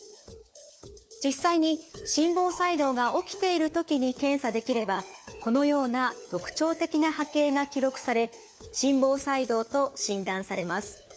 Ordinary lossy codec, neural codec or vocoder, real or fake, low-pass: none; codec, 16 kHz, 4 kbps, FunCodec, trained on LibriTTS, 50 frames a second; fake; none